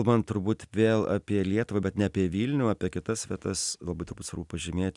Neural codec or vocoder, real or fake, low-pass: none; real; 10.8 kHz